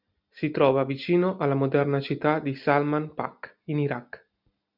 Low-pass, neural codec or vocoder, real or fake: 5.4 kHz; none; real